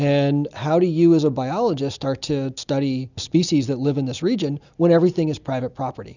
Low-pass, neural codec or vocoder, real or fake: 7.2 kHz; none; real